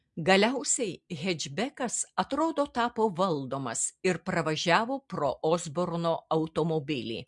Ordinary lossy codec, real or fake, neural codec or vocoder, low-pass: MP3, 64 kbps; real; none; 10.8 kHz